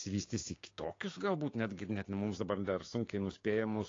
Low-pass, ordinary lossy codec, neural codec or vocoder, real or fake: 7.2 kHz; AAC, 32 kbps; codec, 16 kHz, 6 kbps, DAC; fake